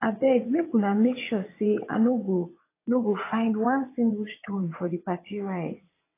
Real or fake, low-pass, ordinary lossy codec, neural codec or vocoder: fake; 3.6 kHz; AAC, 24 kbps; vocoder, 22.05 kHz, 80 mel bands, WaveNeXt